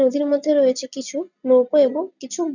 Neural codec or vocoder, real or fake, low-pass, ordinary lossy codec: none; real; 7.2 kHz; none